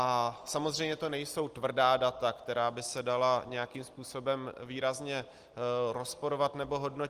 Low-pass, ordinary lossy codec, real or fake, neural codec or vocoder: 14.4 kHz; Opus, 24 kbps; real; none